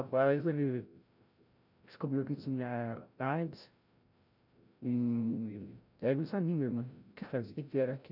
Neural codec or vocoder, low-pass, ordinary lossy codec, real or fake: codec, 16 kHz, 0.5 kbps, FreqCodec, larger model; 5.4 kHz; none; fake